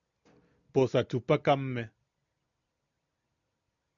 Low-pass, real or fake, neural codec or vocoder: 7.2 kHz; real; none